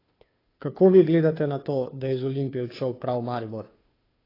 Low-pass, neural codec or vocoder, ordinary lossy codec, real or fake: 5.4 kHz; codec, 16 kHz, 2 kbps, FunCodec, trained on Chinese and English, 25 frames a second; AAC, 24 kbps; fake